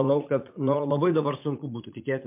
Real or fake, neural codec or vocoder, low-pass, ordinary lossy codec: fake; vocoder, 44.1 kHz, 80 mel bands, Vocos; 3.6 kHz; MP3, 32 kbps